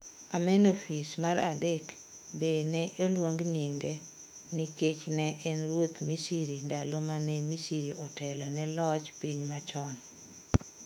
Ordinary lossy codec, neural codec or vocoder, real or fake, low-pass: none; autoencoder, 48 kHz, 32 numbers a frame, DAC-VAE, trained on Japanese speech; fake; 19.8 kHz